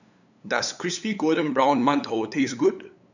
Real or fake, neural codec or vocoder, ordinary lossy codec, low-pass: fake; codec, 16 kHz, 8 kbps, FunCodec, trained on LibriTTS, 25 frames a second; none; 7.2 kHz